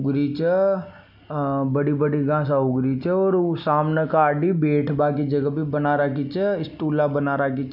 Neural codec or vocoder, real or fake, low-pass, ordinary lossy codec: none; real; 5.4 kHz; none